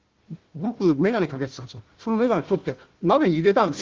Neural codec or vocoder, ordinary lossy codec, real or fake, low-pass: codec, 16 kHz, 1 kbps, FunCodec, trained on Chinese and English, 50 frames a second; Opus, 16 kbps; fake; 7.2 kHz